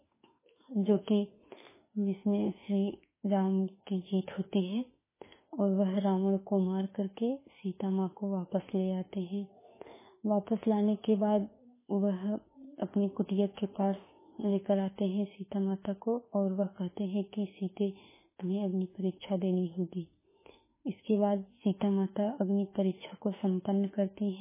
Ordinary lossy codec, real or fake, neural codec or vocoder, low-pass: MP3, 16 kbps; fake; autoencoder, 48 kHz, 32 numbers a frame, DAC-VAE, trained on Japanese speech; 3.6 kHz